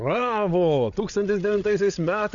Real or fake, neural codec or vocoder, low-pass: fake; codec, 16 kHz, 8 kbps, FreqCodec, larger model; 7.2 kHz